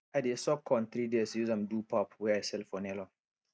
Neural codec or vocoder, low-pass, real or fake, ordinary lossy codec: none; none; real; none